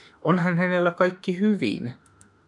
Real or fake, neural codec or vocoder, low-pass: fake; autoencoder, 48 kHz, 32 numbers a frame, DAC-VAE, trained on Japanese speech; 10.8 kHz